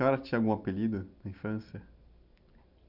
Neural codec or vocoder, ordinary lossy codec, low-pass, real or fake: none; none; 5.4 kHz; real